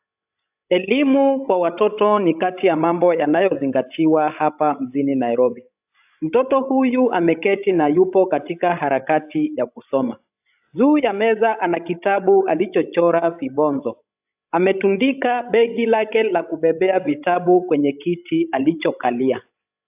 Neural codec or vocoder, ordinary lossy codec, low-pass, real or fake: codec, 16 kHz, 16 kbps, FreqCodec, larger model; AAC, 32 kbps; 3.6 kHz; fake